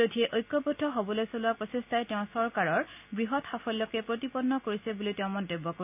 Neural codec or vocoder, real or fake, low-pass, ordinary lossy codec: none; real; 3.6 kHz; AAC, 32 kbps